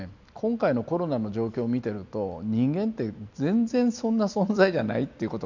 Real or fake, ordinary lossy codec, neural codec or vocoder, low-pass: real; none; none; 7.2 kHz